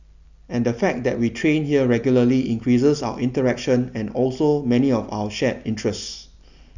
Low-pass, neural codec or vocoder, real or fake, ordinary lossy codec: 7.2 kHz; none; real; none